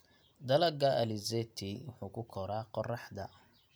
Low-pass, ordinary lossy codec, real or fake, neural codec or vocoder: none; none; real; none